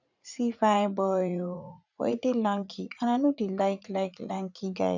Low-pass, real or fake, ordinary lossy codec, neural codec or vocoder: 7.2 kHz; fake; none; vocoder, 44.1 kHz, 80 mel bands, Vocos